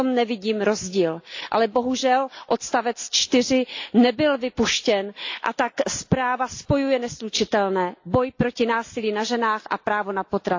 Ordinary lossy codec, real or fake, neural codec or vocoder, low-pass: AAC, 48 kbps; real; none; 7.2 kHz